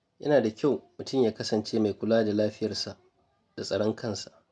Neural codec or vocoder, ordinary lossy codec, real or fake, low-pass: none; none; real; none